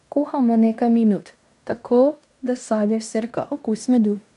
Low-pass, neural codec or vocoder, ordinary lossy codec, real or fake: 10.8 kHz; codec, 16 kHz in and 24 kHz out, 0.9 kbps, LongCat-Audio-Codec, fine tuned four codebook decoder; none; fake